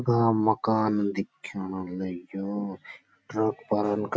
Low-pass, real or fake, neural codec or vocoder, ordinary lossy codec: none; real; none; none